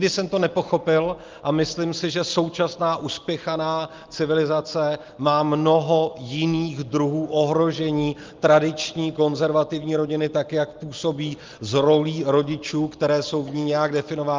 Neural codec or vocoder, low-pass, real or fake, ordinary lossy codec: none; 7.2 kHz; real; Opus, 32 kbps